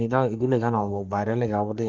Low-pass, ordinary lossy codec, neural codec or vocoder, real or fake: 7.2 kHz; Opus, 24 kbps; codec, 44.1 kHz, 3.4 kbps, Pupu-Codec; fake